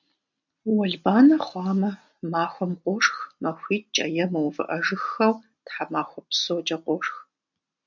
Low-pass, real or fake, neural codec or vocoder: 7.2 kHz; real; none